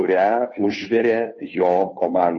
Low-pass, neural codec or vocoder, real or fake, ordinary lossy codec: 7.2 kHz; codec, 16 kHz, 2 kbps, FunCodec, trained on Chinese and English, 25 frames a second; fake; MP3, 32 kbps